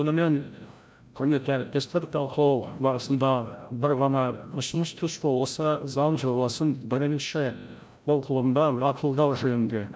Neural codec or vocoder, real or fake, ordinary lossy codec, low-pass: codec, 16 kHz, 0.5 kbps, FreqCodec, larger model; fake; none; none